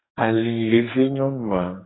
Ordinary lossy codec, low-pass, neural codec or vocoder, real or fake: AAC, 16 kbps; 7.2 kHz; codec, 44.1 kHz, 2.6 kbps, SNAC; fake